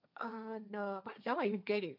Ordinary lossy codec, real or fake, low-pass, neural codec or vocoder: none; fake; 5.4 kHz; codec, 16 kHz, 1.1 kbps, Voila-Tokenizer